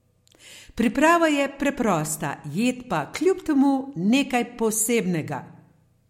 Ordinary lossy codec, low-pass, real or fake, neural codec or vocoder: MP3, 64 kbps; 19.8 kHz; real; none